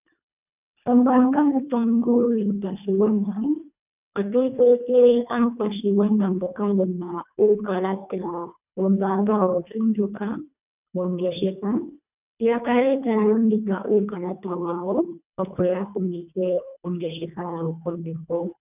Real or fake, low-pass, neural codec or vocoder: fake; 3.6 kHz; codec, 24 kHz, 1.5 kbps, HILCodec